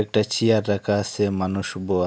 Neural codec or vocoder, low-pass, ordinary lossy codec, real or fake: none; none; none; real